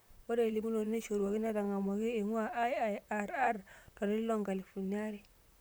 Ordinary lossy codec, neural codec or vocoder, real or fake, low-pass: none; vocoder, 44.1 kHz, 128 mel bands, Pupu-Vocoder; fake; none